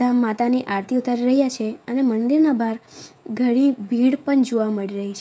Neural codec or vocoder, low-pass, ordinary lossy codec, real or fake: codec, 16 kHz, 16 kbps, FreqCodec, smaller model; none; none; fake